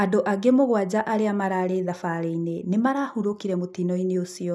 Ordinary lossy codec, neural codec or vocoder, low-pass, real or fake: none; none; none; real